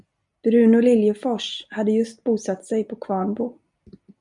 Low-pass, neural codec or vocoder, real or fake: 10.8 kHz; none; real